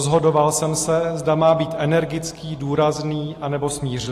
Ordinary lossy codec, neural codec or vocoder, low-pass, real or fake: AAC, 48 kbps; none; 14.4 kHz; real